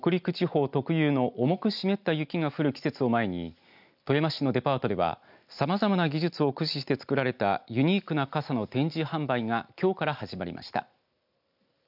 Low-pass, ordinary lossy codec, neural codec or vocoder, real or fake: 5.4 kHz; none; none; real